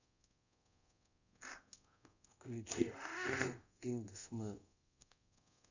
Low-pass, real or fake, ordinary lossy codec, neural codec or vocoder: 7.2 kHz; fake; none; codec, 24 kHz, 0.5 kbps, DualCodec